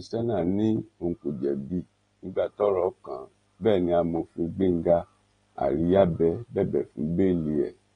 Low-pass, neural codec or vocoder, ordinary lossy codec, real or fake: 9.9 kHz; none; AAC, 32 kbps; real